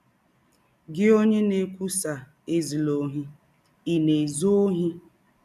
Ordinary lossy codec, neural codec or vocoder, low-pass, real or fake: none; none; 14.4 kHz; real